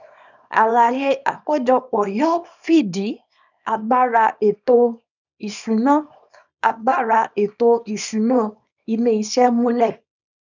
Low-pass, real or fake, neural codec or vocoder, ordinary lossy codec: 7.2 kHz; fake; codec, 24 kHz, 0.9 kbps, WavTokenizer, small release; none